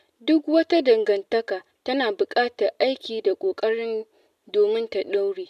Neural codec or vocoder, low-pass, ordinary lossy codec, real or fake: none; 14.4 kHz; none; real